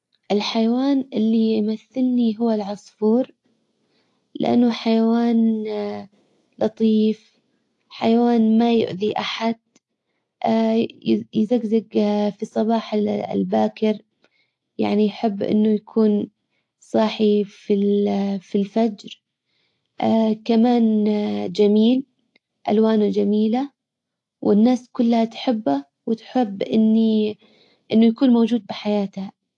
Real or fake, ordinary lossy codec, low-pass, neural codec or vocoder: real; AAC, 48 kbps; 10.8 kHz; none